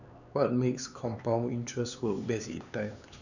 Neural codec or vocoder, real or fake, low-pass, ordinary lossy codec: codec, 16 kHz, 4 kbps, X-Codec, HuBERT features, trained on LibriSpeech; fake; 7.2 kHz; none